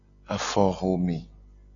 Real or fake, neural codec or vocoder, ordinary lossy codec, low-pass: real; none; MP3, 96 kbps; 7.2 kHz